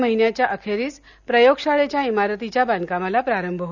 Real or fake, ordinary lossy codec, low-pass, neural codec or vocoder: real; none; 7.2 kHz; none